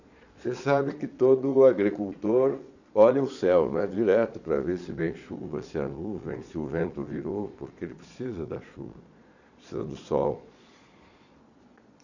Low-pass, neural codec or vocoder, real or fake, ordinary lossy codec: 7.2 kHz; vocoder, 22.05 kHz, 80 mel bands, Vocos; fake; none